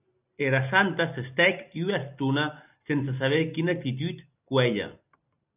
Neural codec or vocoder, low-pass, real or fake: none; 3.6 kHz; real